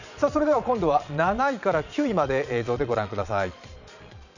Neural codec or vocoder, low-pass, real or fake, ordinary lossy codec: vocoder, 22.05 kHz, 80 mel bands, Vocos; 7.2 kHz; fake; none